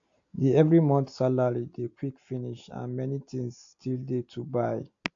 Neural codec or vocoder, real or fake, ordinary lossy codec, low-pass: none; real; AAC, 48 kbps; 7.2 kHz